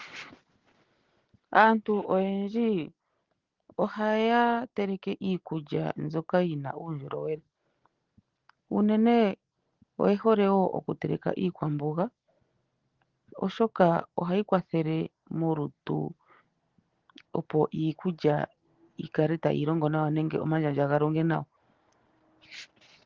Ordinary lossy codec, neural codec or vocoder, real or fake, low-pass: Opus, 16 kbps; none; real; 7.2 kHz